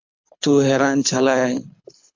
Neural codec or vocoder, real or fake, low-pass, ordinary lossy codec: codec, 24 kHz, 6 kbps, HILCodec; fake; 7.2 kHz; MP3, 64 kbps